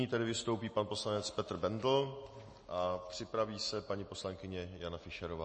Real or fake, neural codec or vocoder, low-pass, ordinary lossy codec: real; none; 10.8 kHz; MP3, 32 kbps